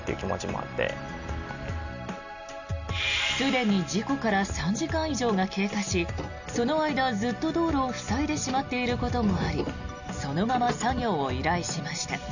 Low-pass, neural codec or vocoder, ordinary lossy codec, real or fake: 7.2 kHz; none; none; real